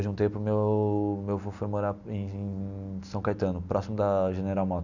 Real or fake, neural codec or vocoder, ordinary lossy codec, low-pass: real; none; none; 7.2 kHz